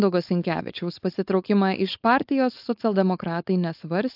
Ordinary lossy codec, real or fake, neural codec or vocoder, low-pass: AAC, 48 kbps; fake; codec, 16 kHz, 4.8 kbps, FACodec; 5.4 kHz